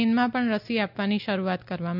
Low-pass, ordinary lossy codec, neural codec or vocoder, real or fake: 5.4 kHz; none; none; real